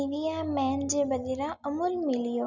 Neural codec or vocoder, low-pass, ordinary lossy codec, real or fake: none; 7.2 kHz; none; real